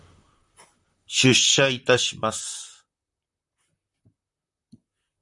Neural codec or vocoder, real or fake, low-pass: vocoder, 44.1 kHz, 128 mel bands, Pupu-Vocoder; fake; 10.8 kHz